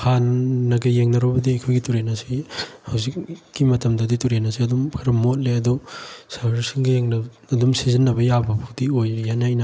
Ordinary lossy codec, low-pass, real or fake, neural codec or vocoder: none; none; real; none